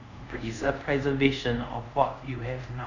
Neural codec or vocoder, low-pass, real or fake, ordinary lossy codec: codec, 24 kHz, 0.5 kbps, DualCodec; 7.2 kHz; fake; none